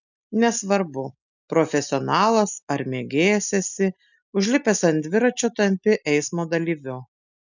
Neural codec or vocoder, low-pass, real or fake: none; 7.2 kHz; real